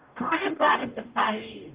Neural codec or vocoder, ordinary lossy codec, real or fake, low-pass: codec, 44.1 kHz, 0.9 kbps, DAC; Opus, 32 kbps; fake; 3.6 kHz